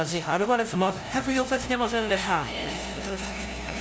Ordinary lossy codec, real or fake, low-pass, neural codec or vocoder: none; fake; none; codec, 16 kHz, 0.5 kbps, FunCodec, trained on LibriTTS, 25 frames a second